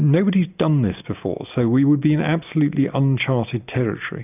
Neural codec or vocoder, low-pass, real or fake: none; 3.6 kHz; real